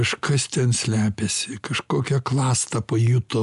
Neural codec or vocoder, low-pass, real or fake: none; 10.8 kHz; real